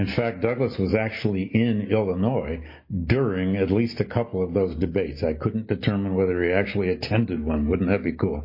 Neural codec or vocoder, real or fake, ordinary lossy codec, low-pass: none; real; MP3, 24 kbps; 5.4 kHz